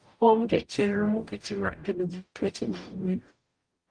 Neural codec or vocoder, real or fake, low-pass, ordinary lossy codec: codec, 44.1 kHz, 0.9 kbps, DAC; fake; 9.9 kHz; Opus, 32 kbps